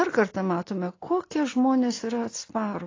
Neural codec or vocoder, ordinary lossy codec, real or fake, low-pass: none; AAC, 32 kbps; real; 7.2 kHz